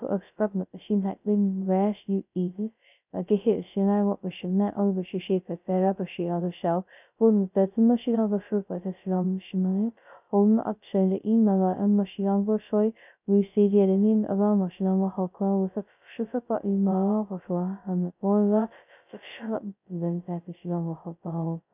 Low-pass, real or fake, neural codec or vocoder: 3.6 kHz; fake; codec, 16 kHz, 0.2 kbps, FocalCodec